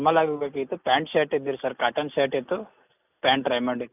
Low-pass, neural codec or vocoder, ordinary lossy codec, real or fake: 3.6 kHz; none; none; real